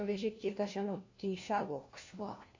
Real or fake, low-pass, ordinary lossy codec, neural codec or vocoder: fake; 7.2 kHz; AAC, 48 kbps; codec, 16 kHz, 1 kbps, FunCodec, trained on LibriTTS, 50 frames a second